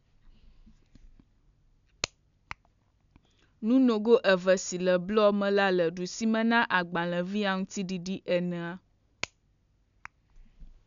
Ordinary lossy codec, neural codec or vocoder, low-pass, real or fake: none; none; 7.2 kHz; real